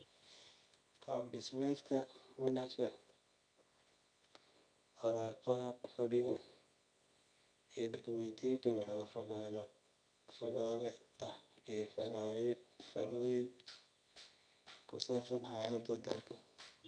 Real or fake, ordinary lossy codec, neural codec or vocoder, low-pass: fake; none; codec, 24 kHz, 0.9 kbps, WavTokenizer, medium music audio release; 9.9 kHz